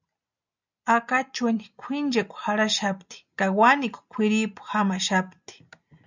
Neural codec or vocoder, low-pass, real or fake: none; 7.2 kHz; real